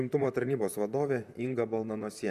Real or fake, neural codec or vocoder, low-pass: fake; vocoder, 44.1 kHz, 128 mel bands, Pupu-Vocoder; 14.4 kHz